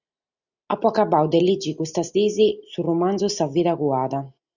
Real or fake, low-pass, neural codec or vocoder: real; 7.2 kHz; none